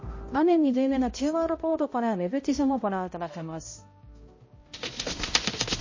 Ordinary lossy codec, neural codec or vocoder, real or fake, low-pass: MP3, 32 kbps; codec, 16 kHz, 0.5 kbps, X-Codec, HuBERT features, trained on balanced general audio; fake; 7.2 kHz